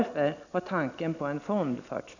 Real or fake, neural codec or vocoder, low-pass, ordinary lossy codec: real; none; 7.2 kHz; none